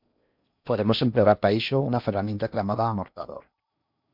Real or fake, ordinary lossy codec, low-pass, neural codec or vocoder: fake; MP3, 48 kbps; 5.4 kHz; codec, 16 kHz in and 24 kHz out, 0.6 kbps, FocalCodec, streaming, 4096 codes